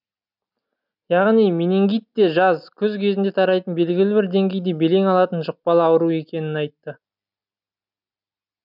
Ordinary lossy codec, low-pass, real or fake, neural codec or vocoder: none; 5.4 kHz; real; none